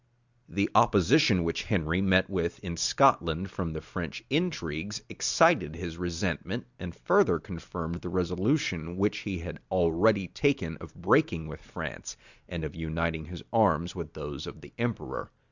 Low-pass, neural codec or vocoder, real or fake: 7.2 kHz; none; real